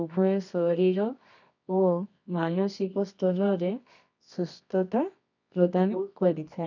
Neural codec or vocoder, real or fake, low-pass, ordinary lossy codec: codec, 24 kHz, 0.9 kbps, WavTokenizer, medium music audio release; fake; 7.2 kHz; none